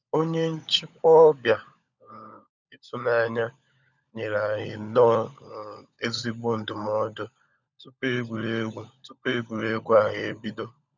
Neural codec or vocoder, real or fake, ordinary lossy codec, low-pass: codec, 16 kHz, 16 kbps, FunCodec, trained on LibriTTS, 50 frames a second; fake; none; 7.2 kHz